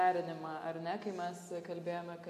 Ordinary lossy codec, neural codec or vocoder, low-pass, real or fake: AAC, 96 kbps; none; 14.4 kHz; real